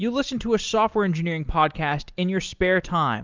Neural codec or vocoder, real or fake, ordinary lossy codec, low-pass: none; real; Opus, 24 kbps; 7.2 kHz